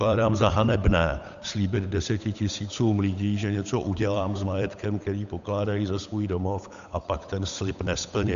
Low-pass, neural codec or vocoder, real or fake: 7.2 kHz; codec, 16 kHz, 8 kbps, FunCodec, trained on Chinese and English, 25 frames a second; fake